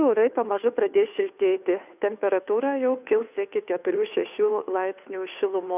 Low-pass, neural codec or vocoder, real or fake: 3.6 kHz; codec, 16 kHz, 2 kbps, FunCodec, trained on Chinese and English, 25 frames a second; fake